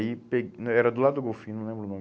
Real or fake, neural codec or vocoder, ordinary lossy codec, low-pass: real; none; none; none